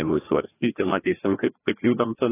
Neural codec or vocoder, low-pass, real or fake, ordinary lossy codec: codec, 16 kHz, 1 kbps, FreqCodec, larger model; 3.6 kHz; fake; AAC, 16 kbps